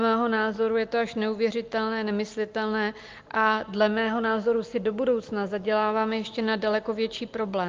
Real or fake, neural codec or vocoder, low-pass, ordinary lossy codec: real; none; 7.2 kHz; Opus, 32 kbps